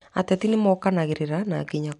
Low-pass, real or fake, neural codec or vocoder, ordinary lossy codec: 10.8 kHz; real; none; none